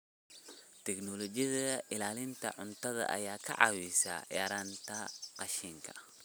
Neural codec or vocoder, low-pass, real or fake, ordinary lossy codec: none; none; real; none